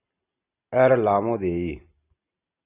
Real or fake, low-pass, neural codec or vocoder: real; 3.6 kHz; none